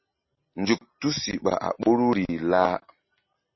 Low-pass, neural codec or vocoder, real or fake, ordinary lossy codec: 7.2 kHz; none; real; MP3, 24 kbps